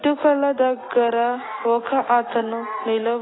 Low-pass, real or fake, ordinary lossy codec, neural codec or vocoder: 7.2 kHz; real; AAC, 16 kbps; none